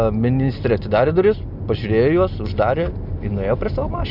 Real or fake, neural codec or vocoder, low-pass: real; none; 5.4 kHz